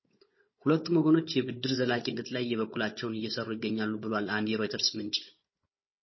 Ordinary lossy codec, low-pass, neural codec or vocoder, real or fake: MP3, 24 kbps; 7.2 kHz; codec, 16 kHz, 16 kbps, FunCodec, trained on Chinese and English, 50 frames a second; fake